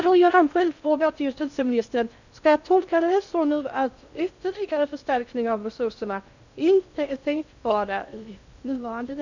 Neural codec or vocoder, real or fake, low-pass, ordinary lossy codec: codec, 16 kHz in and 24 kHz out, 0.6 kbps, FocalCodec, streaming, 2048 codes; fake; 7.2 kHz; none